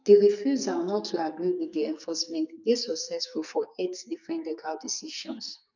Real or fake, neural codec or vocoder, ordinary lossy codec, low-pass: fake; codec, 44.1 kHz, 2.6 kbps, SNAC; none; 7.2 kHz